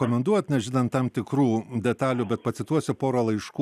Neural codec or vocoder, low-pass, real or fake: none; 14.4 kHz; real